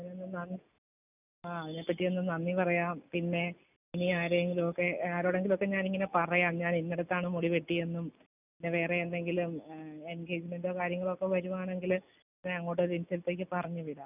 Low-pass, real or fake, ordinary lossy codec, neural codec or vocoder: 3.6 kHz; real; none; none